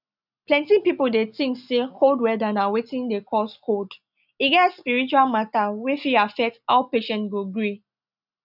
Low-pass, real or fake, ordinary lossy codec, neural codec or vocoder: 5.4 kHz; real; none; none